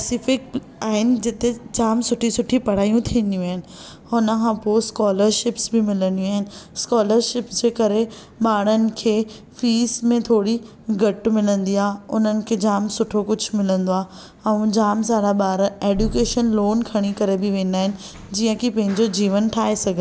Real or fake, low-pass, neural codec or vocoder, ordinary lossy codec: real; none; none; none